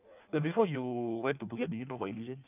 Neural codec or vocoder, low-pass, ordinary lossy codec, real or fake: codec, 16 kHz in and 24 kHz out, 1.1 kbps, FireRedTTS-2 codec; 3.6 kHz; none; fake